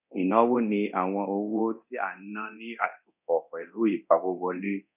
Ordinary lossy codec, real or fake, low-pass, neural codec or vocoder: none; fake; 3.6 kHz; codec, 24 kHz, 0.9 kbps, DualCodec